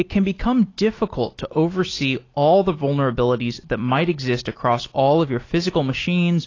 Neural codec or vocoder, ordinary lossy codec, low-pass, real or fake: none; AAC, 32 kbps; 7.2 kHz; real